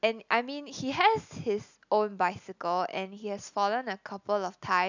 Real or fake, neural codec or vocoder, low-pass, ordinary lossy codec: real; none; 7.2 kHz; none